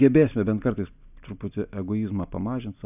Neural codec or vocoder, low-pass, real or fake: none; 3.6 kHz; real